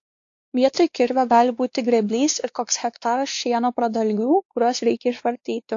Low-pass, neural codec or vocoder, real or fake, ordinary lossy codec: 7.2 kHz; codec, 16 kHz, 2 kbps, X-Codec, WavLM features, trained on Multilingual LibriSpeech; fake; AAC, 48 kbps